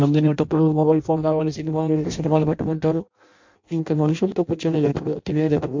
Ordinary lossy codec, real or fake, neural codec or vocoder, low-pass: MP3, 48 kbps; fake; codec, 16 kHz in and 24 kHz out, 0.6 kbps, FireRedTTS-2 codec; 7.2 kHz